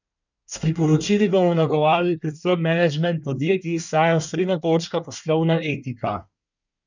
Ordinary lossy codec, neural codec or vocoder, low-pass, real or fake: none; codec, 24 kHz, 1 kbps, SNAC; 7.2 kHz; fake